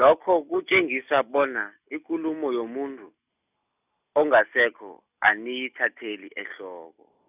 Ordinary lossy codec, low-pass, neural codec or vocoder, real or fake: none; 3.6 kHz; none; real